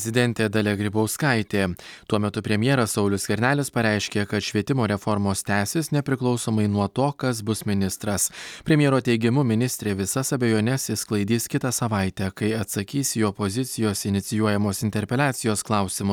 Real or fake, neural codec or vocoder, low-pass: real; none; 19.8 kHz